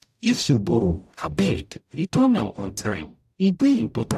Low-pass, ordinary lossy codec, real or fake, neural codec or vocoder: 14.4 kHz; AAC, 96 kbps; fake; codec, 44.1 kHz, 0.9 kbps, DAC